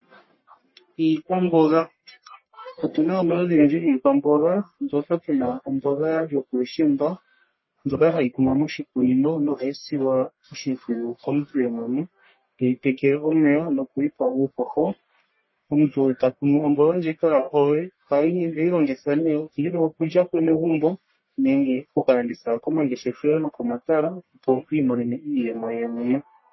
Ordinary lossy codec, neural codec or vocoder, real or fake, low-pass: MP3, 24 kbps; codec, 44.1 kHz, 1.7 kbps, Pupu-Codec; fake; 7.2 kHz